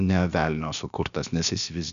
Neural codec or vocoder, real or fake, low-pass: codec, 16 kHz, about 1 kbps, DyCAST, with the encoder's durations; fake; 7.2 kHz